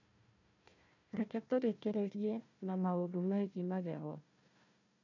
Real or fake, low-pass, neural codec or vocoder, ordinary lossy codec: fake; 7.2 kHz; codec, 16 kHz, 1 kbps, FunCodec, trained on Chinese and English, 50 frames a second; none